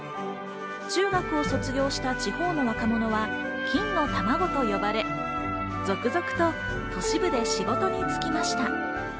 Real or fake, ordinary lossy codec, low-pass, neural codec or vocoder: real; none; none; none